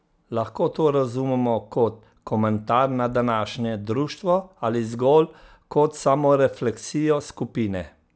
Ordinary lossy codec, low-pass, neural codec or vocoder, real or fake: none; none; none; real